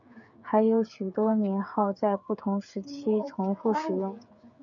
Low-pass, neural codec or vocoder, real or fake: 7.2 kHz; codec, 16 kHz, 8 kbps, FreqCodec, smaller model; fake